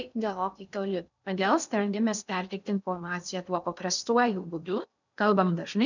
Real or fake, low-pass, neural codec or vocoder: fake; 7.2 kHz; codec, 16 kHz in and 24 kHz out, 0.6 kbps, FocalCodec, streaming, 2048 codes